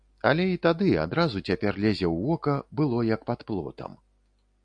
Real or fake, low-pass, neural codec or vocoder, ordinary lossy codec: real; 9.9 kHz; none; AAC, 64 kbps